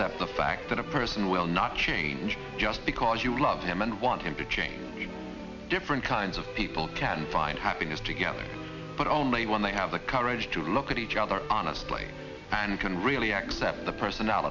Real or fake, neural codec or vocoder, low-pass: real; none; 7.2 kHz